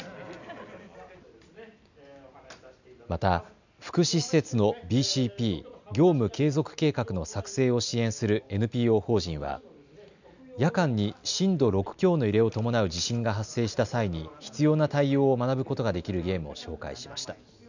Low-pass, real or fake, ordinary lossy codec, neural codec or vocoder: 7.2 kHz; real; none; none